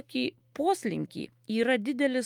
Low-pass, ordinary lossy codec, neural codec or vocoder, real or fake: 19.8 kHz; Opus, 32 kbps; autoencoder, 48 kHz, 128 numbers a frame, DAC-VAE, trained on Japanese speech; fake